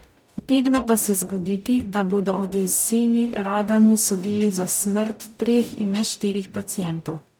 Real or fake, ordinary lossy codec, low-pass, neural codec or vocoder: fake; none; none; codec, 44.1 kHz, 0.9 kbps, DAC